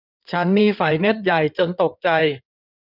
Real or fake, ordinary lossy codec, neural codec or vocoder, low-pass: fake; none; codec, 16 kHz in and 24 kHz out, 2.2 kbps, FireRedTTS-2 codec; 5.4 kHz